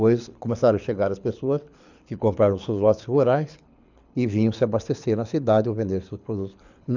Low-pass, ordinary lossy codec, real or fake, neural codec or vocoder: 7.2 kHz; none; fake; codec, 24 kHz, 6 kbps, HILCodec